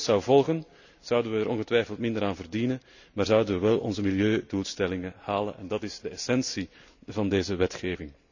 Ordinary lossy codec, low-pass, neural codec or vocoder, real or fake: none; 7.2 kHz; none; real